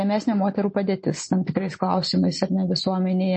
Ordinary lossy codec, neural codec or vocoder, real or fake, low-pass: MP3, 32 kbps; none; real; 9.9 kHz